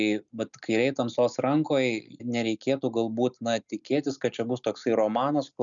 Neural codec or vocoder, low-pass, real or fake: none; 7.2 kHz; real